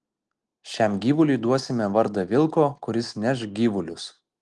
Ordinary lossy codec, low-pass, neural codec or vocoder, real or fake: Opus, 24 kbps; 10.8 kHz; none; real